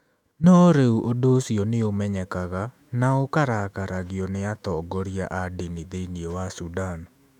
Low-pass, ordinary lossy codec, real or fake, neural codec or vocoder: 19.8 kHz; none; fake; autoencoder, 48 kHz, 128 numbers a frame, DAC-VAE, trained on Japanese speech